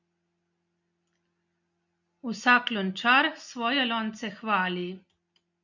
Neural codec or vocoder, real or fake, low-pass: none; real; 7.2 kHz